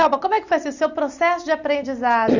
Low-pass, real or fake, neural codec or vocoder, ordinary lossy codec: 7.2 kHz; real; none; none